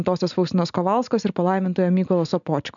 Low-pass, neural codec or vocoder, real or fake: 7.2 kHz; none; real